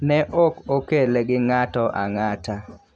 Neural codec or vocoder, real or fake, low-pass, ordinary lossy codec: none; real; none; none